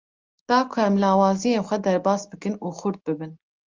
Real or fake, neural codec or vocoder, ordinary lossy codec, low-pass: real; none; Opus, 32 kbps; 7.2 kHz